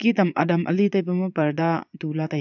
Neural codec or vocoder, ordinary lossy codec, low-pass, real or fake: vocoder, 22.05 kHz, 80 mel bands, Vocos; none; 7.2 kHz; fake